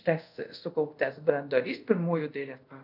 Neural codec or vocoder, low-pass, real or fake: codec, 24 kHz, 0.5 kbps, DualCodec; 5.4 kHz; fake